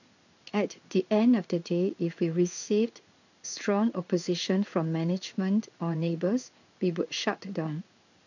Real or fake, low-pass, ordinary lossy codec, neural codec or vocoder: fake; 7.2 kHz; none; codec, 16 kHz in and 24 kHz out, 1 kbps, XY-Tokenizer